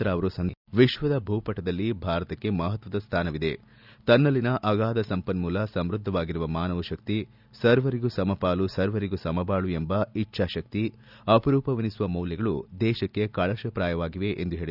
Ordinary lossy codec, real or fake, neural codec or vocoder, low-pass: none; real; none; 5.4 kHz